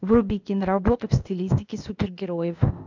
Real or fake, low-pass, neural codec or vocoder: fake; 7.2 kHz; codec, 16 kHz, 0.8 kbps, ZipCodec